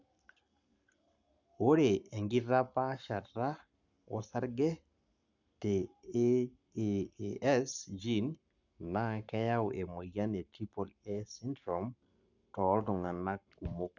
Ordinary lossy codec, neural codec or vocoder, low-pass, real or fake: none; codec, 44.1 kHz, 7.8 kbps, Pupu-Codec; 7.2 kHz; fake